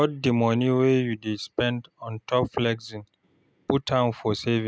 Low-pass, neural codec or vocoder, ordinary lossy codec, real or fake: none; none; none; real